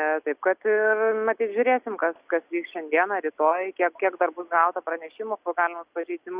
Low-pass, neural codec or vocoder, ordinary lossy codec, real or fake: 3.6 kHz; none; Opus, 64 kbps; real